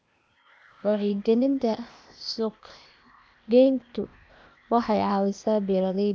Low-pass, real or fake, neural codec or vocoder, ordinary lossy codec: none; fake; codec, 16 kHz, 0.8 kbps, ZipCodec; none